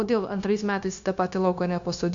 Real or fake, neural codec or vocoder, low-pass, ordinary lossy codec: fake; codec, 16 kHz, 0.9 kbps, LongCat-Audio-Codec; 7.2 kHz; MP3, 64 kbps